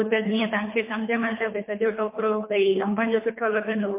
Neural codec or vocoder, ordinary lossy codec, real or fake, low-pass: codec, 24 kHz, 3 kbps, HILCodec; MP3, 24 kbps; fake; 3.6 kHz